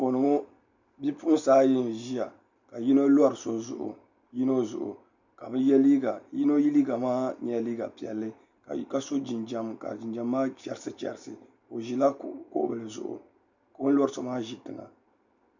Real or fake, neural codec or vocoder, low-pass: real; none; 7.2 kHz